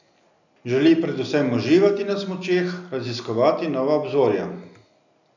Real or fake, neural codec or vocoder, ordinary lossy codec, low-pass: real; none; none; 7.2 kHz